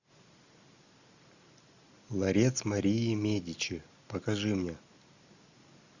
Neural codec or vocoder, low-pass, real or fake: none; 7.2 kHz; real